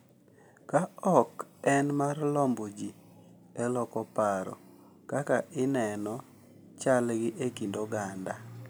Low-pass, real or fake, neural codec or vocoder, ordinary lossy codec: none; real; none; none